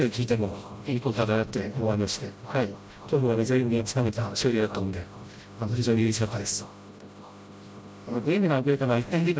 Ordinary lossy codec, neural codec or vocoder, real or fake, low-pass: none; codec, 16 kHz, 0.5 kbps, FreqCodec, smaller model; fake; none